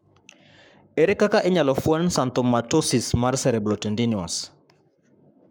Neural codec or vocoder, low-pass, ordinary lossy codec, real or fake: codec, 44.1 kHz, 7.8 kbps, Pupu-Codec; none; none; fake